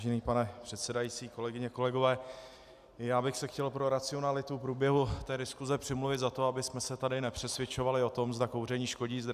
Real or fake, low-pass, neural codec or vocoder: real; 14.4 kHz; none